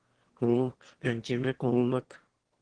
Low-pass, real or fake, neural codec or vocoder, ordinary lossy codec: 9.9 kHz; fake; autoencoder, 22.05 kHz, a latent of 192 numbers a frame, VITS, trained on one speaker; Opus, 16 kbps